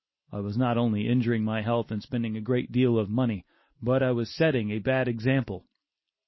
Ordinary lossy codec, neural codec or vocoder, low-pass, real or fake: MP3, 24 kbps; none; 7.2 kHz; real